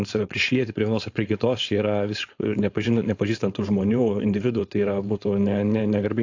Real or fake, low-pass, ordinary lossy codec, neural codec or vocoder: fake; 7.2 kHz; AAC, 48 kbps; codec, 16 kHz, 4.8 kbps, FACodec